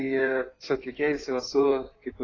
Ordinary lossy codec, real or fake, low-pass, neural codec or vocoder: AAC, 32 kbps; fake; 7.2 kHz; codec, 16 kHz in and 24 kHz out, 2.2 kbps, FireRedTTS-2 codec